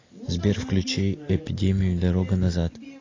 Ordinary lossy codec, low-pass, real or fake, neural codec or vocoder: AAC, 32 kbps; 7.2 kHz; real; none